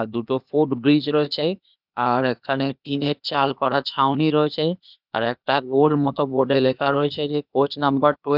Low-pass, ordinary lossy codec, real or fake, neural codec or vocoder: 5.4 kHz; none; fake; codec, 16 kHz, 0.8 kbps, ZipCodec